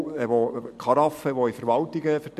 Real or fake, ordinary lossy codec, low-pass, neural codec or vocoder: real; MP3, 64 kbps; 14.4 kHz; none